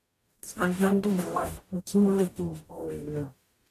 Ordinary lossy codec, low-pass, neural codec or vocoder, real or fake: AAC, 64 kbps; 14.4 kHz; codec, 44.1 kHz, 0.9 kbps, DAC; fake